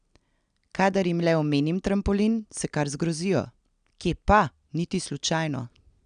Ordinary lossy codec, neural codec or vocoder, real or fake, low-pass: none; none; real; 9.9 kHz